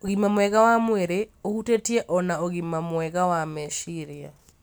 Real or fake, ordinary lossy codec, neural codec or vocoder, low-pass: real; none; none; none